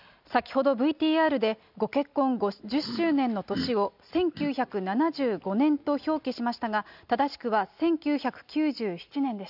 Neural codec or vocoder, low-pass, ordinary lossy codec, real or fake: none; 5.4 kHz; none; real